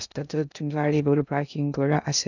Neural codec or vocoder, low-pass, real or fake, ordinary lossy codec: codec, 16 kHz in and 24 kHz out, 0.6 kbps, FocalCodec, streaming, 2048 codes; 7.2 kHz; fake; none